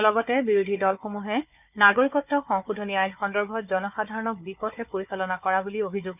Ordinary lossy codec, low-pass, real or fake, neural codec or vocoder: none; 3.6 kHz; fake; codec, 16 kHz, 4 kbps, FunCodec, trained on Chinese and English, 50 frames a second